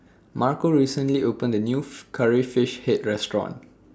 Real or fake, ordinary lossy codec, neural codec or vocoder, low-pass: real; none; none; none